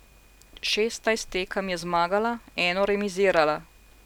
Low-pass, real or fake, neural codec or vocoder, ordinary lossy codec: 19.8 kHz; real; none; none